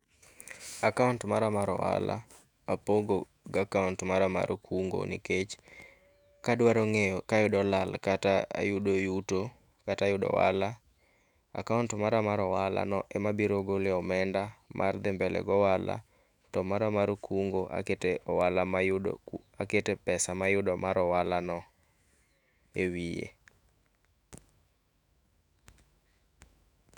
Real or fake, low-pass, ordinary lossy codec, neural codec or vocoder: fake; 19.8 kHz; none; autoencoder, 48 kHz, 128 numbers a frame, DAC-VAE, trained on Japanese speech